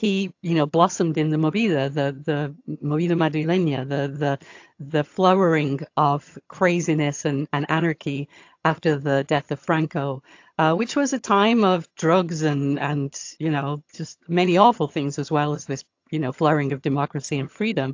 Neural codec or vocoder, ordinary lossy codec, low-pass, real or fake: vocoder, 22.05 kHz, 80 mel bands, HiFi-GAN; AAC, 48 kbps; 7.2 kHz; fake